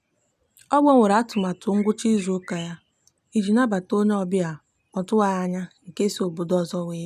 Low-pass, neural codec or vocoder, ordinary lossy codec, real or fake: 14.4 kHz; none; none; real